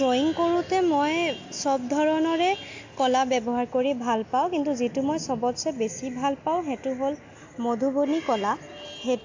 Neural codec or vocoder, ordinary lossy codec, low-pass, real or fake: none; AAC, 48 kbps; 7.2 kHz; real